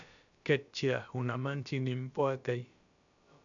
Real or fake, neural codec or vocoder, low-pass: fake; codec, 16 kHz, about 1 kbps, DyCAST, with the encoder's durations; 7.2 kHz